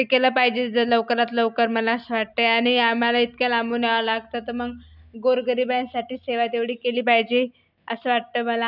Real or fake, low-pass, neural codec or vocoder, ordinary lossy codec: real; 5.4 kHz; none; none